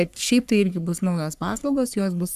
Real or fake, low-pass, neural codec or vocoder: fake; 14.4 kHz; codec, 44.1 kHz, 3.4 kbps, Pupu-Codec